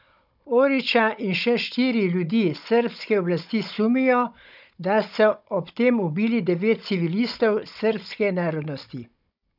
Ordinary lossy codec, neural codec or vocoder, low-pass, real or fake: none; codec, 16 kHz, 16 kbps, FunCodec, trained on Chinese and English, 50 frames a second; 5.4 kHz; fake